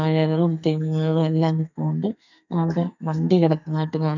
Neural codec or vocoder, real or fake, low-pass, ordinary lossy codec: codec, 44.1 kHz, 2.6 kbps, SNAC; fake; 7.2 kHz; none